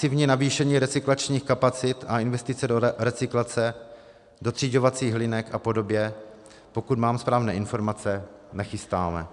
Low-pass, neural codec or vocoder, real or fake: 10.8 kHz; none; real